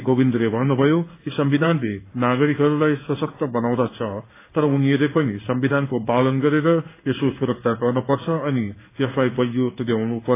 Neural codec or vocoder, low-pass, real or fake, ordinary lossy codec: codec, 16 kHz in and 24 kHz out, 1 kbps, XY-Tokenizer; 3.6 kHz; fake; AAC, 24 kbps